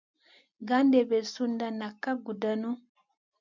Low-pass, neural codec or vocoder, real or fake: 7.2 kHz; none; real